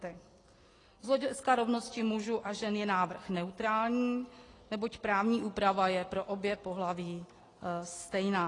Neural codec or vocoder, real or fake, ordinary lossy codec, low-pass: none; real; AAC, 32 kbps; 10.8 kHz